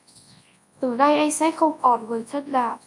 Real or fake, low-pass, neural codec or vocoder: fake; 10.8 kHz; codec, 24 kHz, 0.9 kbps, WavTokenizer, large speech release